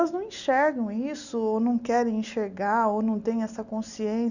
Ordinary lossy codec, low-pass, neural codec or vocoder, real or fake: none; 7.2 kHz; none; real